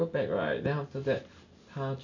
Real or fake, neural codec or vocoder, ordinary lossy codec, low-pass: real; none; none; 7.2 kHz